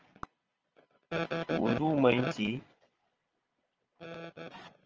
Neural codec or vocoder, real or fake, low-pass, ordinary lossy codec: vocoder, 22.05 kHz, 80 mel bands, Vocos; fake; 7.2 kHz; Opus, 24 kbps